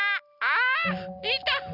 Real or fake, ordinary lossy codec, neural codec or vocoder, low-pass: real; none; none; 5.4 kHz